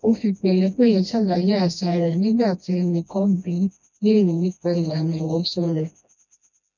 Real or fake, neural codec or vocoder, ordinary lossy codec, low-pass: fake; codec, 16 kHz, 1 kbps, FreqCodec, smaller model; none; 7.2 kHz